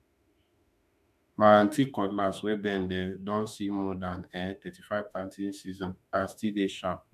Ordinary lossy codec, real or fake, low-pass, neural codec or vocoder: none; fake; 14.4 kHz; autoencoder, 48 kHz, 32 numbers a frame, DAC-VAE, trained on Japanese speech